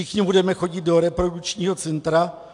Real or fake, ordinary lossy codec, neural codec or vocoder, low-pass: real; AAC, 64 kbps; none; 10.8 kHz